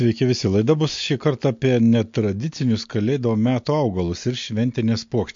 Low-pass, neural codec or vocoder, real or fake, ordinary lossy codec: 7.2 kHz; none; real; MP3, 48 kbps